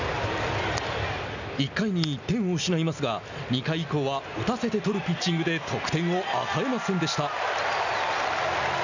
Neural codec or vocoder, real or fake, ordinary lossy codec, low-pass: none; real; none; 7.2 kHz